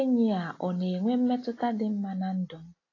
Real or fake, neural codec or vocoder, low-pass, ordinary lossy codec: real; none; 7.2 kHz; AAC, 32 kbps